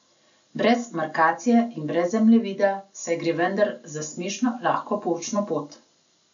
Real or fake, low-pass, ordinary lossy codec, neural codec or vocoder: real; 7.2 kHz; MP3, 96 kbps; none